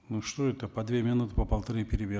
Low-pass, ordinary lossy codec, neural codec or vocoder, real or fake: none; none; none; real